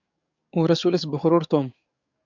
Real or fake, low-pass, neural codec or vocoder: fake; 7.2 kHz; codec, 44.1 kHz, 7.8 kbps, DAC